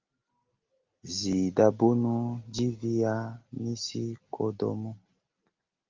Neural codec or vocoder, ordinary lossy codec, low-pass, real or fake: none; Opus, 32 kbps; 7.2 kHz; real